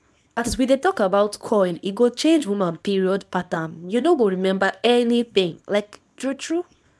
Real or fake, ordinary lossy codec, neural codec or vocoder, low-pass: fake; none; codec, 24 kHz, 0.9 kbps, WavTokenizer, small release; none